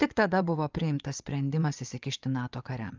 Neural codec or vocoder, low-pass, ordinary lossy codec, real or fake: vocoder, 24 kHz, 100 mel bands, Vocos; 7.2 kHz; Opus, 24 kbps; fake